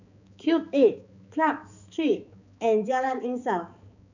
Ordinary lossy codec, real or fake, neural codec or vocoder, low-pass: none; fake; codec, 16 kHz, 4 kbps, X-Codec, HuBERT features, trained on balanced general audio; 7.2 kHz